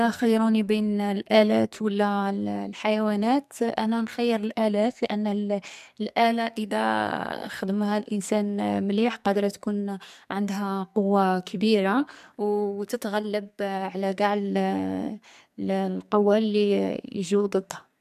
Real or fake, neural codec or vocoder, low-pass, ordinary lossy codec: fake; codec, 44.1 kHz, 2.6 kbps, SNAC; 14.4 kHz; MP3, 96 kbps